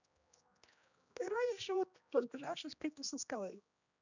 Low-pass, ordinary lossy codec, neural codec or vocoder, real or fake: 7.2 kHz; none; codec, 16 kHz, 1 kbps, X-Codec, HuBERT features, trained on general audio; fake